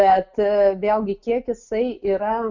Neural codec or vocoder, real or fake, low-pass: none; real; 7.2 kHz